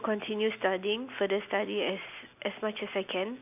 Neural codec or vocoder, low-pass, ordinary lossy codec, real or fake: none; 3.6 kHz; none; real